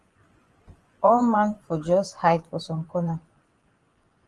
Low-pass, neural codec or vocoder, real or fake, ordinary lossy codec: 10.8 kHz; vocoder, 24 kHz, 100 mel bands, Vocos; fake; Opus, 24 kbps